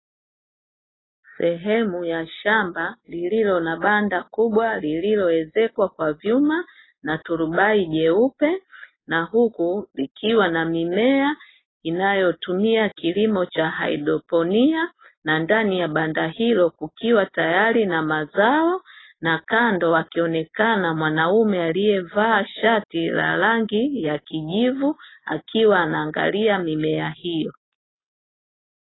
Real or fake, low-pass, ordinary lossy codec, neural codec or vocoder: real; 7.2 kHz; AAC, 16 kbps; none